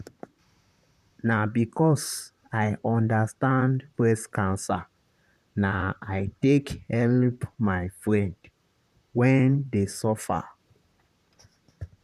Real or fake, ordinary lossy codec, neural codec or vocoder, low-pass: fake; none; vocoder, 44.1 kHz, 128 mel bands, Pupu-Vocoder; 14.4 kHz